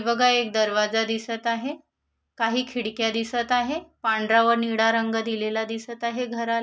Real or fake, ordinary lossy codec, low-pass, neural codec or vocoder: real; none; none; none